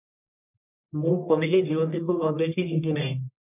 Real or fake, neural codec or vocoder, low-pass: fake; codec, 44.1 kHz, 1.7 kbps, Pupu-Codec; 3.6 kHz